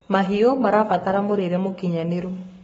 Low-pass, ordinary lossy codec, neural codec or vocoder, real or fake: 19.8 kHz; AAC, 24 kbps; codec, 44.1 kHz, 7.8 kbps, Pupu-Codec; fake